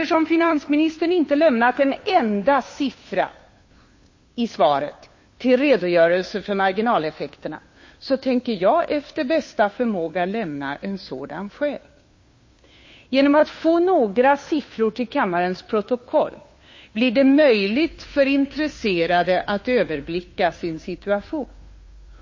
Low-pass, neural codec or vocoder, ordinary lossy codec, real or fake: 7.2 kHz; codec, 16 kHz, 2 kbps, FunCodec, trained on Chinese and English, 25 frames a second; MP3, 32 kbps; fake